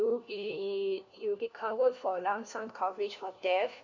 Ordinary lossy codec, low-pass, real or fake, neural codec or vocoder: none; 7.2 kHz; fake; codec, 16 kHz, 1 kbps, FunCodec, trained on LibriTTS, 50 frames a second